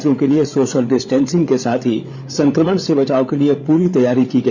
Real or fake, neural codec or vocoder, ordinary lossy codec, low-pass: fake; codec, 16 kHz, 8 kbps, FreqCodec, larger model; none; none